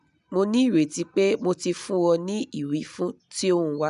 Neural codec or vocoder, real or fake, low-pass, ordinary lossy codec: none; real; 10.8 kHz; none